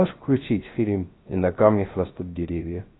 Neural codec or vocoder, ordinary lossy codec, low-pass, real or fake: codec, 16 kHz, 0.3 kbps, FocalCodec; AAC, 16 kbps; 7.2 kHz; fake